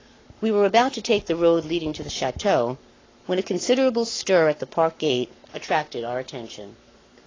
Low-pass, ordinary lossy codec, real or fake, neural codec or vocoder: 7.2 kHz; AAC, 32 kbps; fake; codec, 44.1 kHz, 7.8 kbps, Pupu-Codec